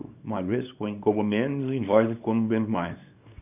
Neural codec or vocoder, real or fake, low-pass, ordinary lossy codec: codec, 24 kHz, 0.9 kbps, WavTokenizer, small release; fake; 3.6 kHz; none